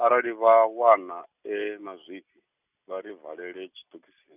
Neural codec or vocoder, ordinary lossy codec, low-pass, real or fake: none; none; 3.6 kHz; real